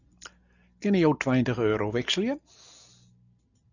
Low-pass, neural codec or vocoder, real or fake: 7.2 kHz; none; real